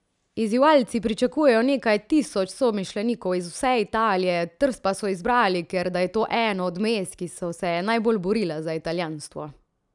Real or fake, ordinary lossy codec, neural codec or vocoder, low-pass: real; none; none; 10.8 kHz